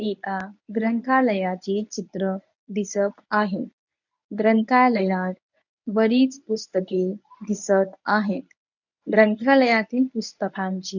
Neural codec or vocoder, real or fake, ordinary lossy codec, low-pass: codec, 24 kHz, 0.9 kbps, WavTokenizer, medium speech release version 1; fake; none; 7.2 kHz